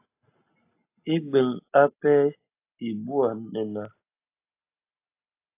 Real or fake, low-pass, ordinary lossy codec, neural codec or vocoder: real; 3.6 kHz; AAC, 24 kbps; none